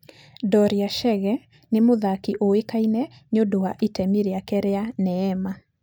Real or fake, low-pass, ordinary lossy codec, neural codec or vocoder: real; none; none; none